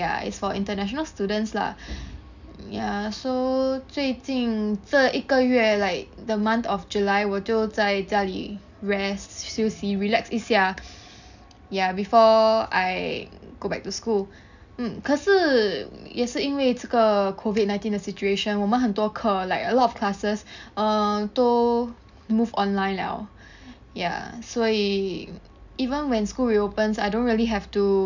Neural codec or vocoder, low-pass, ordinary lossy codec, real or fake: none; 7.2 kHz; none; real